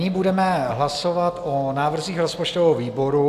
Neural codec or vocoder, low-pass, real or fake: none; 14.4 kHz; real